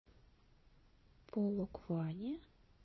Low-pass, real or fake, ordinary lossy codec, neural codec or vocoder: 7.2 kHz; fake; MP3, 24 kbps; vocoder, 22.05 kHz, 80 mel bands, Vocos